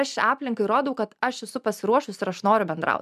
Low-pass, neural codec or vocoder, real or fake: 14.4 kHz; none; real